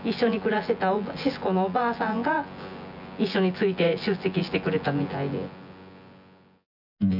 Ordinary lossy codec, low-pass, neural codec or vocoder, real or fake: none; 5.4 kHz; vocoder, 24 kHz, 100 mel bands, Vocos; fake